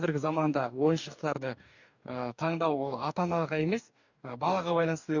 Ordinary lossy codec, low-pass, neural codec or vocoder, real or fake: none; 7.2 kHz; codec, 44.1 kHz, 2.6 kbps, DAC; fake